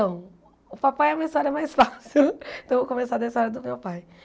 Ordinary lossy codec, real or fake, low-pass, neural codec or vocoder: none; real; none; none